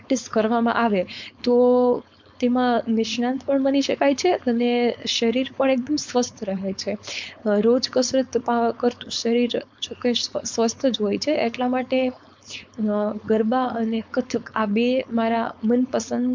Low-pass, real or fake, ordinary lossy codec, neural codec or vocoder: 7.2 kHz; fake; MP3, 64 kbps; codec, 16 kHz, 4.8 kbps, FACodec